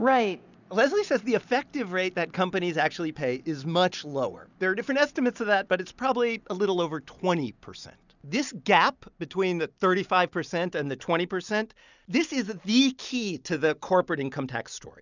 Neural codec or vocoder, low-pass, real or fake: none; 7.2 kHz; real